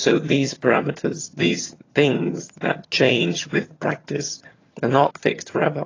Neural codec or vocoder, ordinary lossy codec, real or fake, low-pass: vocoder, 22.05 kHz, 80 mel bands, HiFi-GAN; AAC, 32 kbps; fake; 7.2 kHz